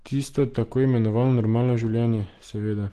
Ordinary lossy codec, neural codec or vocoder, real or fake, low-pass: Opus, 16 kbps; none; real; 14.4 kHz